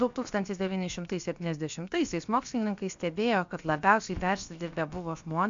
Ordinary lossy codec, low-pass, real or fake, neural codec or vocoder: MP3, 64 kbps; 7.2 kHz; fake; codec, 16 kHz, about 1 kbps, DyCAST, with the encoder's durations